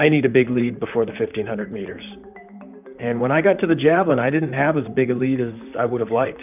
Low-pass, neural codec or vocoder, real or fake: 3.6 kHz; vocoder, 44.1 kHz, 128 mel bands, Pupu-Vocoder; fake